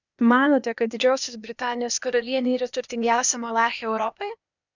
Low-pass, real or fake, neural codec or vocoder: 7.2 kHz; fake; codec, 16 kHz, 0.8 kbps, ZipCodec